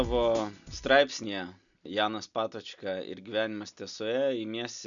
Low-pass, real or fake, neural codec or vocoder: 7.2 kHz; real; none